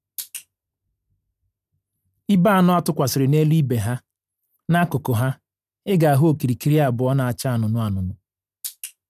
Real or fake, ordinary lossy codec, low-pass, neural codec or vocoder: real; none; 14.4 kHz; none